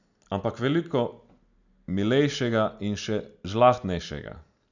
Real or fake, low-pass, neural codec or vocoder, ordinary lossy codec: real; 7.2 kHz; none; none